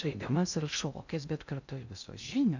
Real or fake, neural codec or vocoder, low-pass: fake; codec, 16 kHz in and 24 kHz out, 0.6 kbps, FocalCodec, streaming, 2048 codes; 7.2 kHz